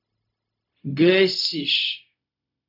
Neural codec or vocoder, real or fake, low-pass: codec, 16 kHz, 0.4 kbps, LongCat-Audio-Codec; fake; 5.4 kHz